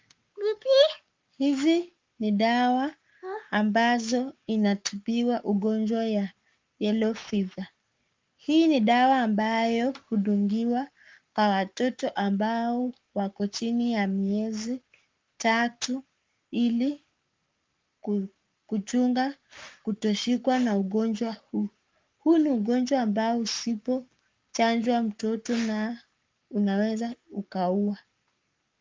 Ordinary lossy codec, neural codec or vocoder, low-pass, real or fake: Opus, 24 kbps; codec, 44.1 kHz, 7.8 kbps, Pupu-Codec; 7.2 kHz; fake